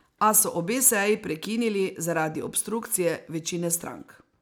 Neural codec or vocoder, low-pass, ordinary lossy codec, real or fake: none; none; none; real